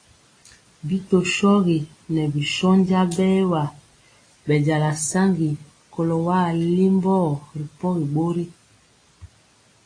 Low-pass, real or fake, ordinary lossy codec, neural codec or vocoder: 9.9 kHz; real; AAC, 32 kbps; none